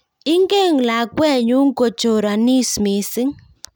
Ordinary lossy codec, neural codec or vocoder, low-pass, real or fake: none; none; none; real